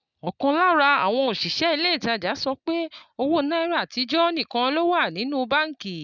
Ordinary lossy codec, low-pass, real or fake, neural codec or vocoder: none; 7.2 kHz; real; none